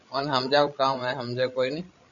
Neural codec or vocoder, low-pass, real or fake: codec, 16 kHz, 16 kbps, FreqCodec, larger model; 7.2 kHz; fake